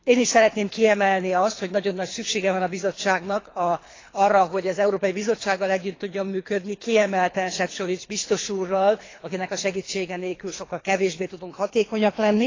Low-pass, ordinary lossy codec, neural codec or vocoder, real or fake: 7.2 kHz; AAC, 32 kbps; codec, 24 kHz, 3 kbps, HILCodec; fake